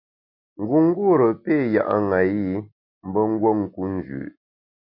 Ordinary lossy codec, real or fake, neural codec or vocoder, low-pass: MP3, 32 kbps; real; none; 5.4 kHz